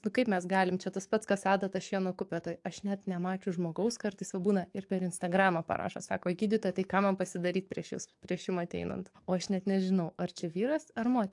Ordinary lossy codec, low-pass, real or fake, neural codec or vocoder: AAC, 64 kbps; 10.8 kHz; fake; codec, 44.1 kHz, 7.8 kbps, DAC